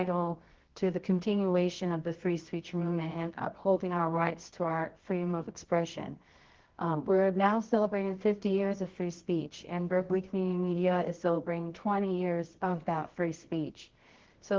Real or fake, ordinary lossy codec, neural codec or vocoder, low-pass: fake; Opus, 16 kbps; codec, 24 kHz, 0.9 kbps, WavTokenizer, medium music audio release; 7.2 kHz